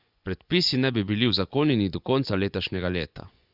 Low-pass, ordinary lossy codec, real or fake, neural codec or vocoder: 5.4 kHz; Opus, 64 kbps; fake; vocoder, 24 kHz, 100 mel bands, Vocos